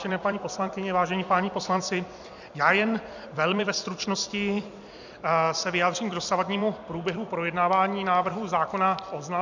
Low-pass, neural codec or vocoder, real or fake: 7.2 kHz; vocoder, 44.1 kHz, 128 mel bands every 256 samples, BigVGAN v2; fake